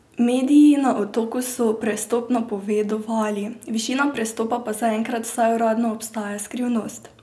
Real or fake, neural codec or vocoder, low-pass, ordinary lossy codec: real; none; none; none